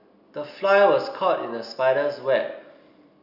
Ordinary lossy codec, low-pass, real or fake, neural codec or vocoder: none; 5.4 kHz; real; none